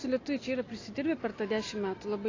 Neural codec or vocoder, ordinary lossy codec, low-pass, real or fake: none; AAC, 32 kbps; 7.2 kHz; real